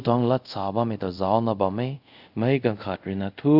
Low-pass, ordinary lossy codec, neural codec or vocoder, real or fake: 5.4 kHz; none; codec, 24 kHz, 0.5 kbps, DualCodec; fake